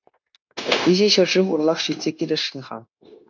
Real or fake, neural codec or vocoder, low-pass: fake; codec, 16 kHz, 0.9 kbps, LongCat-Audio-Codec; 7.2 kHz